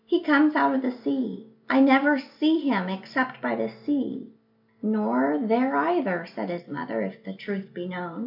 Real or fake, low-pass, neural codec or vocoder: real; 5.4 kHz; none